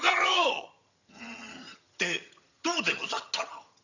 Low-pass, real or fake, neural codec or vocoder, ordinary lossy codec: 7.2 kHz; fake; vocoder, 22.05 kHz, 80 mel bands, HiFi-GAN; none